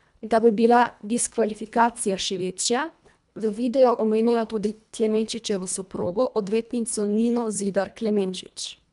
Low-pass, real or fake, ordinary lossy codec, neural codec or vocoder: 10.8 kHz; fake; none; codec, 24 kHz, 1.5 kbps, HILCodec